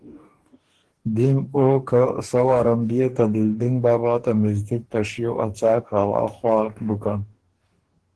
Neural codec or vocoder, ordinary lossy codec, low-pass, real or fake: codec, 44.1 kHz, 2.6 kbps, DAC; Opus, 16 kbps; 10.8 kHz; fake